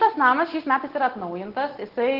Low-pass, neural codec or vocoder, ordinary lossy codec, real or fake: 5.4 kHz; none; Opus, 16 kbps; real